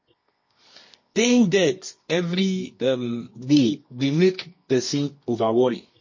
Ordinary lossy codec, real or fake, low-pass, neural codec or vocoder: MP3, 32 kbps; fake; 7.2 kHz; codec, 24 kHz, 0.9 kbps, WavTokenizer, medium music audio release